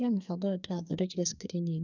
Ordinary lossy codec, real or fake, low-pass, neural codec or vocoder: none; fake; 7.2 kHz; codec, 44.1 kHz, 2.6 kbps, SNAC